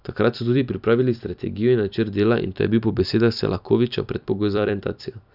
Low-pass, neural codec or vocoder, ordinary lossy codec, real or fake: 5.4 kHz; vocoder, 44.1 kHz, 128 mel bands every 256 samples, BigVGAN v2; none; fake